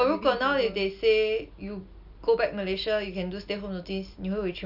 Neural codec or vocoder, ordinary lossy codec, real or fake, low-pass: none; none; real; 5.4 kHz